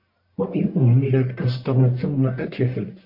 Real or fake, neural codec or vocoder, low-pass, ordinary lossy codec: fake; codec, 44.1 kHz, 1.7 kbps, Pupu-Codec; 5.4 kHz; MP3, 32 kbps